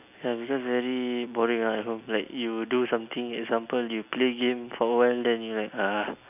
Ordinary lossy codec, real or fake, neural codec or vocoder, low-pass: none; real; none; 3.6 kHz